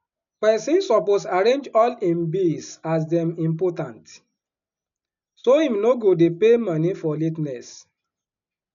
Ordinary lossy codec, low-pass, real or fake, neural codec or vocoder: none; 7.2 kHz; real; none